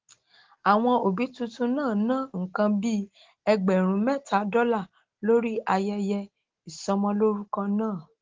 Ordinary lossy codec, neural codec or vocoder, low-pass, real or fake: Opus, 16 kbps; none; 7.2 kHz; real